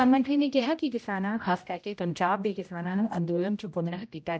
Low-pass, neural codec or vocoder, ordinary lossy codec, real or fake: none; codec, 16 kHz, 0.5 kbps, X-Codec, HuBERT features, trained on general audio; none; fake